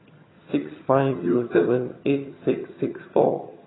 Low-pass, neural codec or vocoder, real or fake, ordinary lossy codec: 7.2 kHz; vocoder, 22.05 kHz, 80 mel bands, HiFi-GAN; fake; AAC, 16 kbps